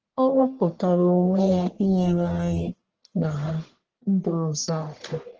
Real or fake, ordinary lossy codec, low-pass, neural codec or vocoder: fake; Opus, 16 kbps; 7.2 kHz; codec, 44.1 kHz, 1.7 kbps, Pupu-Codec